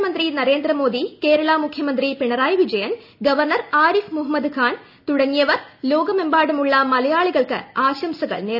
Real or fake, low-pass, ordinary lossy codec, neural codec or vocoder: real; 5.4 kHz; none; none